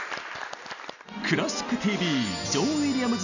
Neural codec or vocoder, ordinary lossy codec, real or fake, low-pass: none; none; real; 7.2 kHz